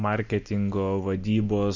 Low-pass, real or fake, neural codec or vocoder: 7.2 kHz; real; none